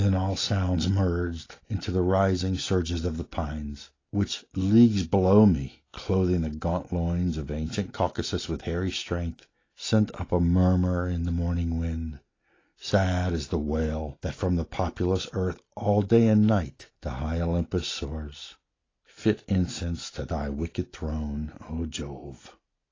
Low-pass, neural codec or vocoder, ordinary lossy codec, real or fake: 7.2 kHz; none; AAC, 32 kbps; real